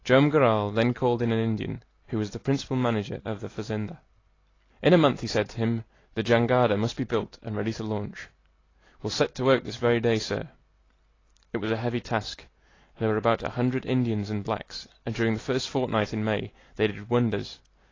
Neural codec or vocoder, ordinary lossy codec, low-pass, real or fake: none; AAC, 32 kbps; 7.2 kHz; real